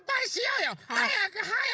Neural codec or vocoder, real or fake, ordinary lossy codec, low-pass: codec, 16 kHz, 16 kbps, FreqCodec, larger model; fake; none; none